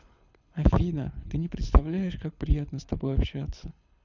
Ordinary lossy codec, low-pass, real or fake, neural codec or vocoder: none; 7.2 kHz; fake; codec, 24 kHz, 6 kbps, HILCodec